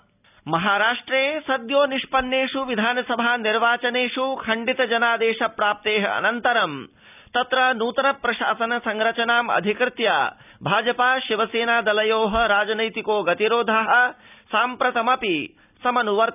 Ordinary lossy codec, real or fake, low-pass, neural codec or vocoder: none; real; 3.6 kHz; none